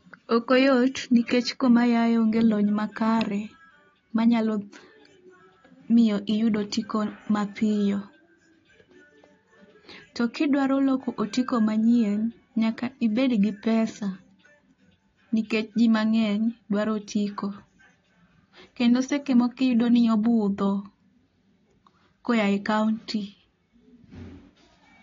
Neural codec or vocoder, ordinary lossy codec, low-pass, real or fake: none; AAC, 32 kbps; 7.2 kHz; real